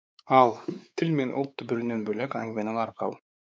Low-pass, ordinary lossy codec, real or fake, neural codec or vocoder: none; none; fake; codec, 16 kHz, 4 kbps, X-Codec, WavLM features, trained on Multilingual LibriSpeech